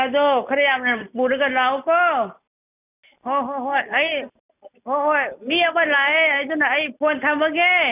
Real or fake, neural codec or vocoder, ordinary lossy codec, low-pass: real; none; none; 3.6 kHz